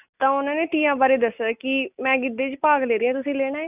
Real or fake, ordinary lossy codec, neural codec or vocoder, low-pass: real; none; none; 3.6 kHz